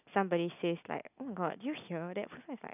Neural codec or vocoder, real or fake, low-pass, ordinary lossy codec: none; real; 3.6 kHz; none